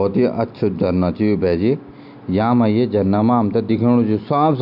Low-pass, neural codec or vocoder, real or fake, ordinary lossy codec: 5.4 kHz; none; real; none